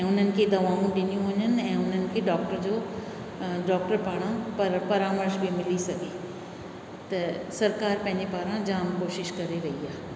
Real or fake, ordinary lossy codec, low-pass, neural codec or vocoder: real; none; none; none